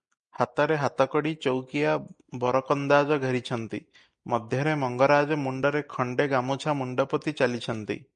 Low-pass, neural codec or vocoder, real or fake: 9.9 kHz; none; real